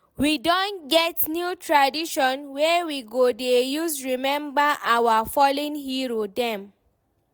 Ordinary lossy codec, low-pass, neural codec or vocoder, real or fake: none; none; none; real